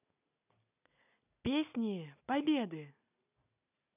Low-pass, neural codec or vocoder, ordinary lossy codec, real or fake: 3.6 kHz; none; none; real